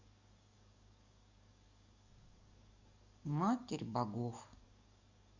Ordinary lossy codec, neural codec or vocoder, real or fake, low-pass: Opus, 64 kbps; codec, 44.1 kHz, 7.8 kbps, DAC; fake; 7.2 kHz